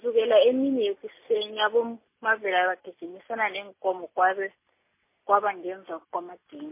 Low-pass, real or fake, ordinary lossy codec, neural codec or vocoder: 3.6 kHz; real; MP3, 24 kbps; none